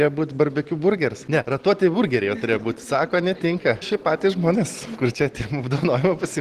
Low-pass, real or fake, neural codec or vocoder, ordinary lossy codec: 14.4 kHz; real; none; Opus, 16 kbps